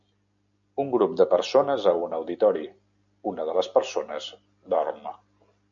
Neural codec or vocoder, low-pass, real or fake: none; 7.2 kHz; real